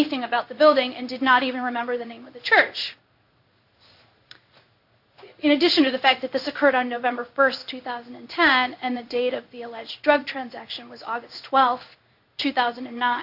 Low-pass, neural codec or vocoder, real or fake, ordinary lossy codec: 5.4 kHz; codec, 16 kHz in and 24 kHz out, 1 kbps, XY-Tokenizer; fake; AAC, 48 kbps